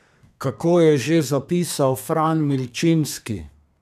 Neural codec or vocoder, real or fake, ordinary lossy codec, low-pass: codec, 32 kHz, 1.9 kbps, SNAC; fake; none; 14.4 kHz